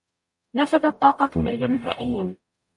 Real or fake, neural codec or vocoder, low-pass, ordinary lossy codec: fake; codec, 44.1 kHz, 0.9 kbps, DAC; 10.8 kHz; MP3, 48 kbps